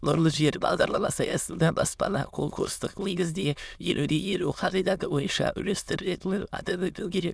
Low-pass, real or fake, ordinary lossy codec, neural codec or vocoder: none; fake; none; autoencoder, 22.05 kHz, a latent of 192 numbers a frame, VITS, trained on many speakers